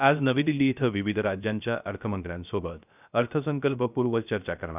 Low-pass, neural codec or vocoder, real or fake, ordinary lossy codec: 3.6 kHz; codec, 16 kHz, 0.3 kbps, FocalCodec; fake; none